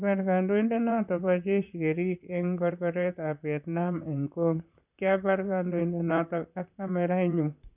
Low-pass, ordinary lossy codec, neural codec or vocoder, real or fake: 3.6 kHz; none; vocoder, 44.1 kHz, 128 mel bands, Pupu-Vocoder; fake